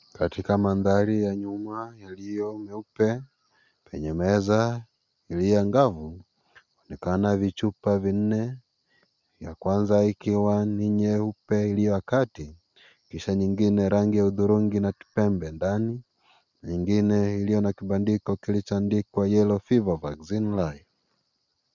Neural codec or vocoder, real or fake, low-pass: none; real; 7.2 kHz